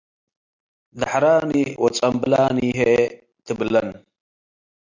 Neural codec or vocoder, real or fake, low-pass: none; real; 7.2 kHz